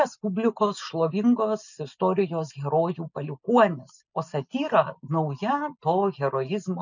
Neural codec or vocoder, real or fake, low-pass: none; real; 7.2 kHz